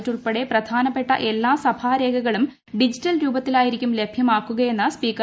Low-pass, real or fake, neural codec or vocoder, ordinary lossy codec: none; real; none; none